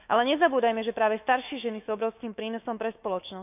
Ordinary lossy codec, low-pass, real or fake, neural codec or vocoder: none; 3.6 kHz; fake; autoencoder, 48 kHz, 128 numbers a frame, DAC-VAE, trained on Japanese speech